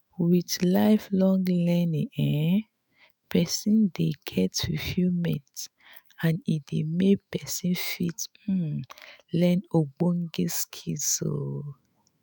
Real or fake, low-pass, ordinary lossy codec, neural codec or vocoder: fake; none; none; autoencoder, 48 kHz, 128 numbers a frame, DAC-VAE, trained on Japanese speech